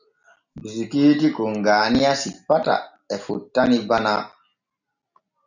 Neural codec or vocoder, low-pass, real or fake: none; 7.2 kHz; real